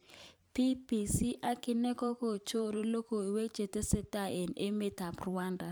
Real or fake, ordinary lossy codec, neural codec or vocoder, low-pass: fake; none; vocoder, 44.1 kHz, 128 mel bands every 512 samples, BigVGAN v2; none